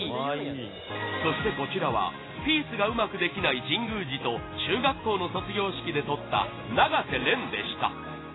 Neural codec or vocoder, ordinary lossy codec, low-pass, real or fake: none; AAC, 16 kbps; 7.2 kHz; real